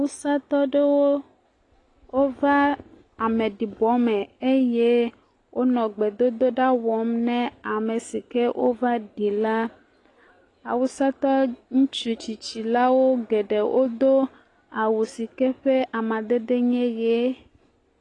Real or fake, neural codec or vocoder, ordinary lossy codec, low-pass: real; none; AAC, 32 kbps; 10.8 kHz